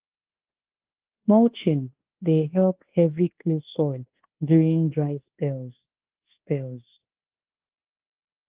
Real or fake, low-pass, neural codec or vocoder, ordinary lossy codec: fake; 3.6 kHz; codec, 24 kHz, 1.2 kbps, DualCodec; Opus, 16 kbps